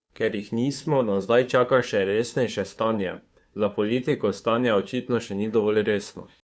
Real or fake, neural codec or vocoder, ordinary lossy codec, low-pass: fake; codec, 16 kHz, 2 kbps, FunCodec, trained on Chinese and English, 25 frames a second; none; none